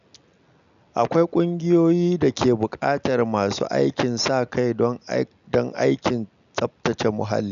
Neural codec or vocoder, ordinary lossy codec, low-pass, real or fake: none; none; 7.2 kHz; real